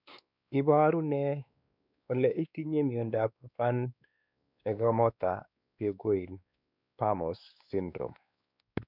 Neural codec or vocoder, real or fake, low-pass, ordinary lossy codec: codec, 16 kHz, 2 kbps, X-Codec, WavLM features, trained on Multilingual LibriSpeech; fake; 5.4 kHz; none